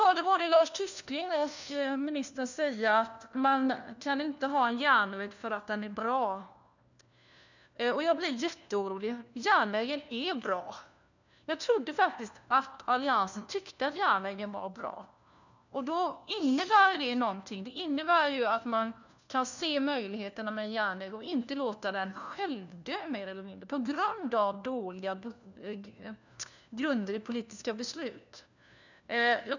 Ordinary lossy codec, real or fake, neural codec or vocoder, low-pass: none; fake; codec, 16 kHz, 1 kbps, FunCodec, trained on LibriTTS, 50 frames a second; 7.2 kHz